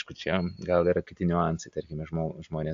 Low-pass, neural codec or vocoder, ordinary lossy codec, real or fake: 7.2 kHz; none; AAC, 64 kbps; real